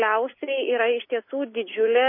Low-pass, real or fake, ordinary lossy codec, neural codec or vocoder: 5.4 kHz; real; MP3, 24 kbps; none